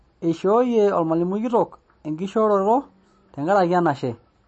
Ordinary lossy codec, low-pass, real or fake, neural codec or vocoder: MP3, 32 kbps; 10.8 kHz; real; none